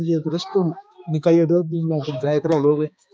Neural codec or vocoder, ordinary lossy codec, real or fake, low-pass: codec, 16 kHz, 2 kbps, X-Codec, HuBERT features, trained on balanced general audio; none; fake; none